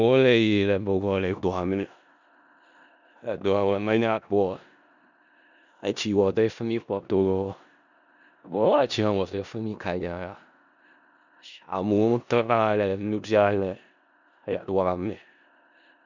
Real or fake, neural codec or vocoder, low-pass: fake; codec, 16 kHz in and 24 kHz out, 0.4 kbps, LongCat-Audio-Codec, four codebook decoder; 7.2 kHz